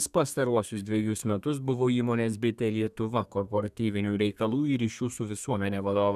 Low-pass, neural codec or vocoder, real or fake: 14.4 kHz; codec, 32 kHz, 1.9 kbps, SNAC; fake